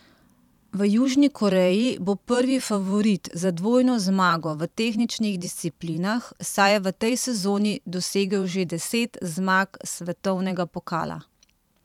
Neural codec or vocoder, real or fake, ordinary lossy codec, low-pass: vocoder, 44.1 kHz, 128 mel bands every 512 samples, BigVGAN v2; fake; none; 19.8 kHz